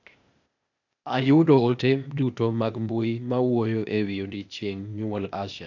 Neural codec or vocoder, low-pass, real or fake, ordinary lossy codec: codec, 16 kHz, 0.8 kbps, ZipCodec; 7.2 kHz; fake; none